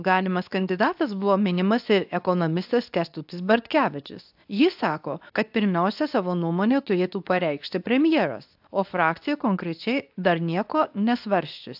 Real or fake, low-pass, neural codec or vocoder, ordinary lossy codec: fake; 5.4 kHz; codec, 24 kHz, 0.9 kbps, WavTokenizer, medium speech release version 2; AAC, 48 kbps